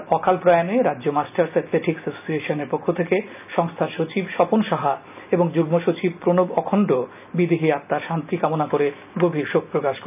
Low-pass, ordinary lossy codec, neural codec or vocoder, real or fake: 3.6 kHz; none; none; real